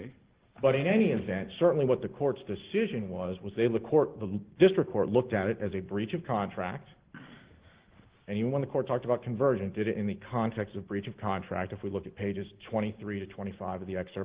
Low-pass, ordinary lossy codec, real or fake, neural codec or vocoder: 3.6 kHz; Opus, 16 kbps; real; none